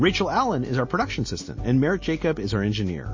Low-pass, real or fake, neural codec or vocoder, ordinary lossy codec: 7.2 kHz; real; none; MP3, 32 kbps